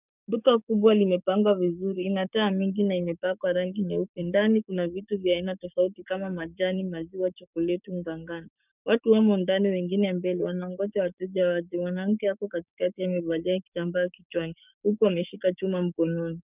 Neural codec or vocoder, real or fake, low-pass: codec, 16 kHz, 6 kbps, DAC; fake; 3.6 kHz